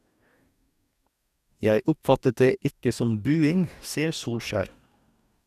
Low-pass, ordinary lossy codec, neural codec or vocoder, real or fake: 14.4 kHz; none; codec, 44.1 kHz, 2.6 kbps, DAC; fake